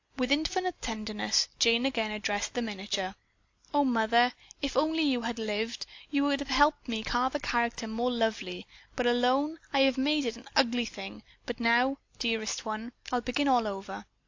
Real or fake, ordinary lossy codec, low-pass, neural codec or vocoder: real; AAC, 48 kbps; 7.2 kHz; none